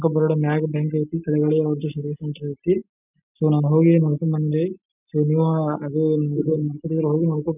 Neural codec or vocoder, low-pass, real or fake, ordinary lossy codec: none; 3.6 kHz; real; none